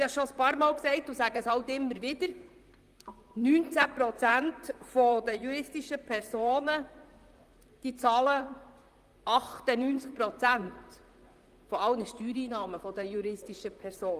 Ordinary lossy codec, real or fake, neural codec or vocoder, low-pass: Opus, 32 kbps; fake; vocoder, 44.1 kHz, 128 mel bands, Pupu-Vocoder; 14.4 kHz